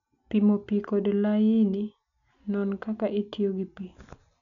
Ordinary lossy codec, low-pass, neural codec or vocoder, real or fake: none; 7.2 kHz; none; real